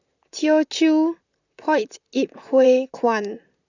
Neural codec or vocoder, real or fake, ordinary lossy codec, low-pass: vocoder, 44.1 kHz, 128 mel bands, Pupu-Vocoder; fake; none; 7.2 kHz